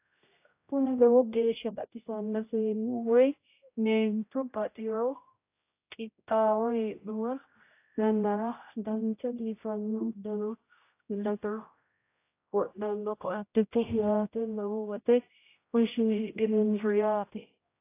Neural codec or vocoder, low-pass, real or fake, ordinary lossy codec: codec, 16 kHz, 0.5 kbps, X-Codec, HuBERT features, trained on general audio; 3.6 kHz; fake; AAC, 24 kbps